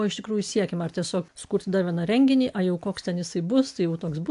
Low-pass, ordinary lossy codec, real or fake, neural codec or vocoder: 10.8 kHz; AAC, 64 kbps; real; none